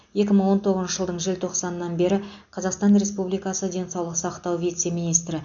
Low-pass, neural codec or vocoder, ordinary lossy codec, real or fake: 7.2 kHz; none; none; real